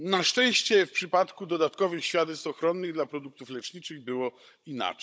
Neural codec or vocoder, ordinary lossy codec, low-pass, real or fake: codec, 16 kHz, 16 kbps, FunCodec, trained on Chinese and English, 50 frames a second; none; none; fake